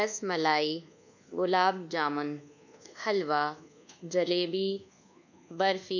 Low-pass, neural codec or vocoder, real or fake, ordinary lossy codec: 7.2 kHz; codec, 24 kHz, 1.2 kbps, DualCodec; fake; none